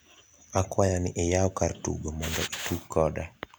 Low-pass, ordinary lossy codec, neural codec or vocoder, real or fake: none; none; none; real